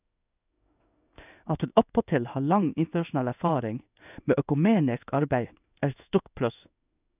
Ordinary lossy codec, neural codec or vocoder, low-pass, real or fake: none; codec, 16 kHz in and 24 kHz out, 1 kbps, XY-Tokenizer; 3.6 kHz; fake